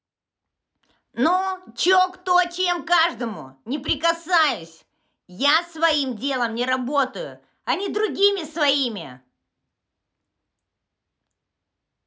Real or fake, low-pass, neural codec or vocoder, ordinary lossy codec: real; none; none; none